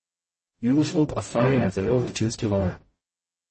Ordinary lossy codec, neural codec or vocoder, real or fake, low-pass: MP3, 32 kbps; codec, 44.1 kHz, 0.9 kbps, DAC; fake; 10.8 kHz